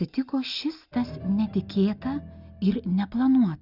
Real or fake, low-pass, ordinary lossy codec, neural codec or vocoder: real; 5.4 kHz; Opus, 64 kbps; none